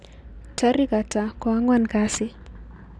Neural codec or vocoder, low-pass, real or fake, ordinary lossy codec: none; none; real; none